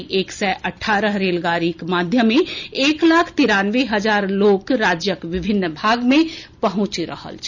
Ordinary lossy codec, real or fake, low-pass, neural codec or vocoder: none; real; 7.2 kHz; none